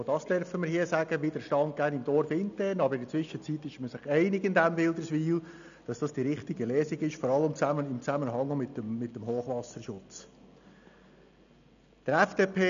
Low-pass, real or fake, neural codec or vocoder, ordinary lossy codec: 7.2 kHz; real; none; none